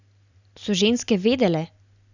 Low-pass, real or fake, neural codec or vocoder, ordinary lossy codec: 7.2 kHz; real; none; none